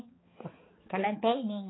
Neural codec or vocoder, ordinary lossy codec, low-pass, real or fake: codec, 16 kHz, 4 kbps, X-Codec, HuBERT features, trained on balanced general audio; AAC, 16 kbps; 7.2 kHz; fake